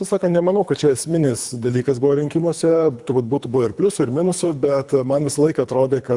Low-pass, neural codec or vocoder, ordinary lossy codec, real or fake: 10.8 kHz; codec, 24 kHz, 3 kbps, HILCodec; Opus, 64 kbps; fake